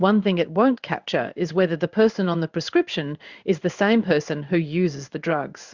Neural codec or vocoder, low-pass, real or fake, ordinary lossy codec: codec, 16 kHz in and 24 kHz out, 1 kbps, XY-Tokenizer; 7.2 kHz; fake; Opus, 64 kbps